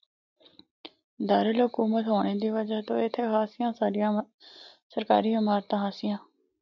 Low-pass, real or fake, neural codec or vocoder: 7.2 kHz; real; none